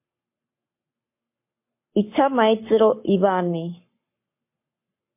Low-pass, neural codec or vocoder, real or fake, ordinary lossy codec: 3.6 kHz; codec, 44.1 kHz, 7.8 kbps, Pupu-Codec; fake; MP3, 24 kbps